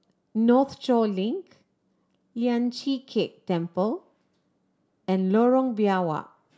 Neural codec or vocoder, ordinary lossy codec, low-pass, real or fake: none; none; none; real